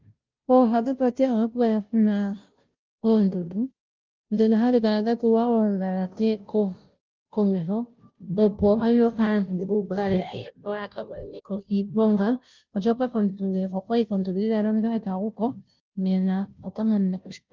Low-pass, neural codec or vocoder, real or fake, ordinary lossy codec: 7.2 kHz; codec, 16 kHz, 0.5 kbps, FunCodec, trained on Chinese and English, 25 frames a second; fake; Opus, 32 kbps